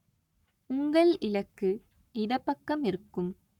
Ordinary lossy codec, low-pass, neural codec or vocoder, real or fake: none; 19.8 kHz; codec, 44.1 kHz, 7.8 kbps, Pupu-Codec; fake